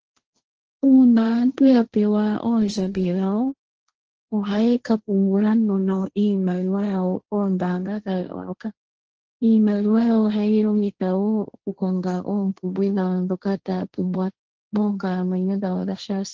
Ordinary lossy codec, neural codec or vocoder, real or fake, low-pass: Opus, 16 kbps; codec, 16 kHz, 1.1 kbps, Voila-Tokenizer; fake; 7.2 kHz